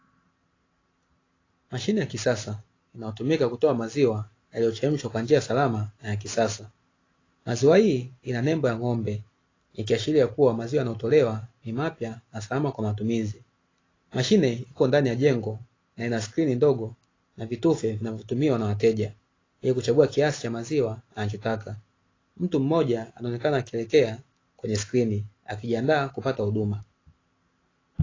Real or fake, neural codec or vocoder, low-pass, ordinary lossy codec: real; none; 7.2 kHz; AAC, 32 kbps